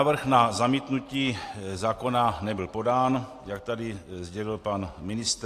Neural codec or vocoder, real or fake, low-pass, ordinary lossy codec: none; real; 14.4 kHz; AAC, 64 kbps